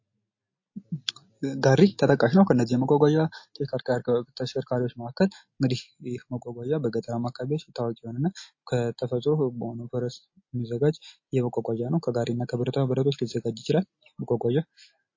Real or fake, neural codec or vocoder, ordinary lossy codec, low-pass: real; none; MP3, 32 kbps; 7.2 kHz